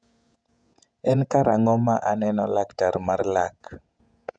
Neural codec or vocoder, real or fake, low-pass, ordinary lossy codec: vocoder, 48 kHz, 128 mel bands, Vocos; fake; 9.9 kHz; none